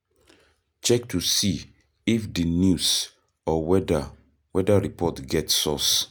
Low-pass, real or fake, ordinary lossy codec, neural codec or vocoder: none; real; none; none